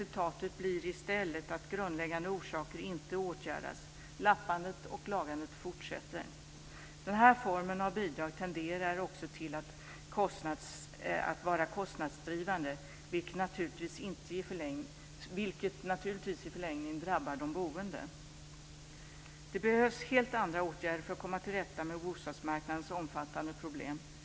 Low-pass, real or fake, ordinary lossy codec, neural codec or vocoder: none; real; none; none